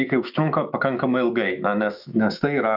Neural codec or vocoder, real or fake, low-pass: autoencoder, 48 kHz, 128 numbers a frame, DAC-VAE, trained on Japanese speech; fake; 5.4 kHz